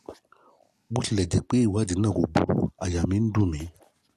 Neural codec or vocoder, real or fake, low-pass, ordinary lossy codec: none; real; 14.4 kHz; AAC, 64 kbps